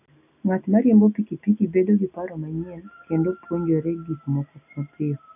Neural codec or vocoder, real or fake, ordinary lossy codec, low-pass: none; real; none; 3.6 kHz